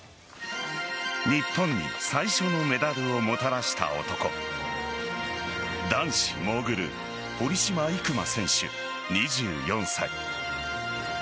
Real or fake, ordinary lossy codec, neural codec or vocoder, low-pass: real; none; none; none